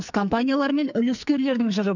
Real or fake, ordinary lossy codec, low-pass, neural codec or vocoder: fake; none; 7.2 kHz; codec, 44.1 kHz, 2.6 kbps, SNAC